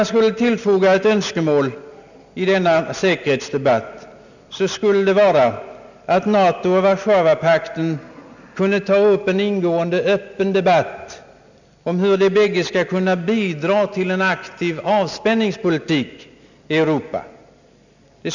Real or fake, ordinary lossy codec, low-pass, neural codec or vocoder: real; none; 7.2 kHz; none